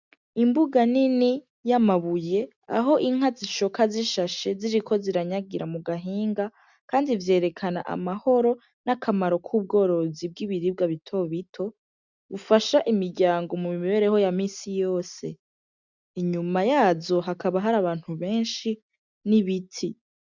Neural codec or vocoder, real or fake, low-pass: none; real; 7.2 kHz